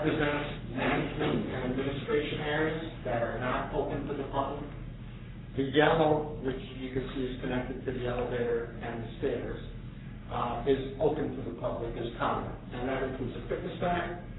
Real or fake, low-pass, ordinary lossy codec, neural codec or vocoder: fake; 7.2 kHz; AAC, 16 kbps; codec, 44.1 kHz, 3.4 kbps, Pupu-Codec